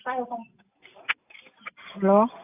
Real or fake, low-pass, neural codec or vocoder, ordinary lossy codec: real; 3.6 kHz; none; AAC, 32 kbps